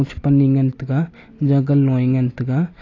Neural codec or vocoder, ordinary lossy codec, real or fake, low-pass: none; AAC, 32 kbps; real; 7.2 kHz